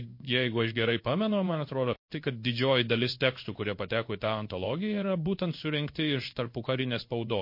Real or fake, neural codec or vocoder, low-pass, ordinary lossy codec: fake; codec, 16 kHz in and 24 kHz out, 1 kbps, XY-Tokenizer; 5.4 kHz; MP3, 32 kbps